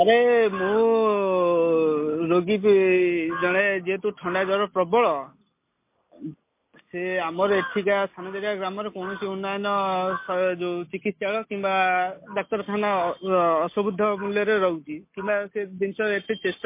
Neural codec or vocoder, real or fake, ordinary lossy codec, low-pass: none; real; MP3, 24 kbps; 3.6 kHz